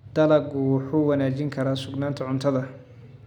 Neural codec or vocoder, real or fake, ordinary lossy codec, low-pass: none; real; none; 19.8 kHz